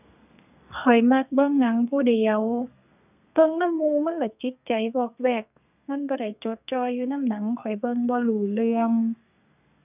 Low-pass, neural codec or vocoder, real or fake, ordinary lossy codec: 3.6 kHz; codec, 32 kHz, 1.9 kbps, SNAC; fake; none